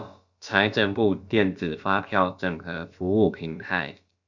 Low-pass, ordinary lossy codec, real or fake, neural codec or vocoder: 7.2 kHz; Opus, 64 kbps; fake; codec, 16 kHz, about 1 kbps, DyCAST, with the encoder's durations